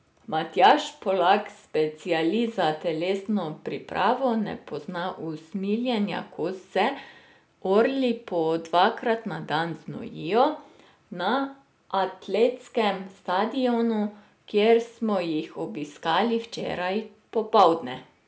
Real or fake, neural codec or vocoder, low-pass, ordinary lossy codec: real; none; none; none